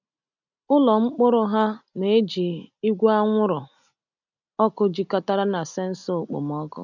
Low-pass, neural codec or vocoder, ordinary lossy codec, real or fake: 7.2 kHz; none; none; real